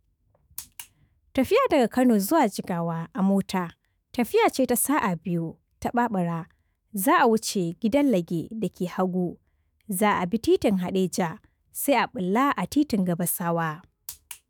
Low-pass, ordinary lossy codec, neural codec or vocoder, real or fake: none; none; autoencoder, 48 kHz, 128 numbers a frame, DAC-VAE, trained on Japanese speech; fake